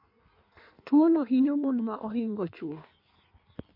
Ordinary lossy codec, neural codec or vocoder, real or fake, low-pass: MP3, 32 kbps; codec, 16 kHz in and 24 kHz out, 1.1 kbps, FireRedTTS-2 codec; fake; 5.4 kHz